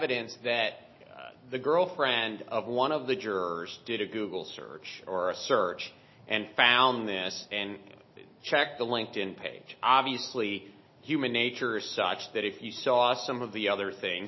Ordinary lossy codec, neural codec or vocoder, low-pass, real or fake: MP3, 24 kbps; none; 7.2 kHz; real